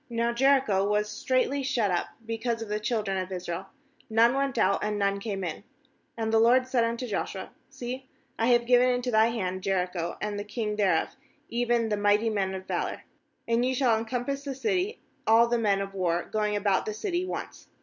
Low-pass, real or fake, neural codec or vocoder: 7.2 kHz; real; none